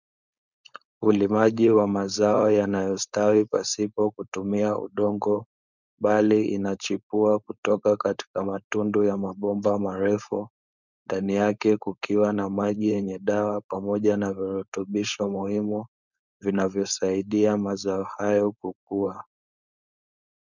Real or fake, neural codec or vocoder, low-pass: fake; codec, 16 kHz, 4.8 kbps, FACodec; 7.2 kHz